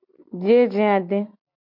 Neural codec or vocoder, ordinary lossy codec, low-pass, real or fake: none; MP3, 48 kbps; 5.4 kHz; real